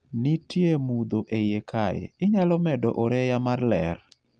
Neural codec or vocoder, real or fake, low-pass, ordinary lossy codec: none; real; 9.9 kHz; Opus, 32 kbps